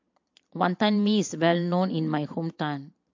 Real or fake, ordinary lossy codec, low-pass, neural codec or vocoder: fake; MP3, 48 kbps; 7.2 kHz; vocoder, 22.05 kHz, 80 mel bands, Vocos